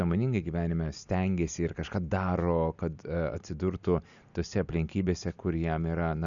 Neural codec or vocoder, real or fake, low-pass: none; real; 7.2 kHz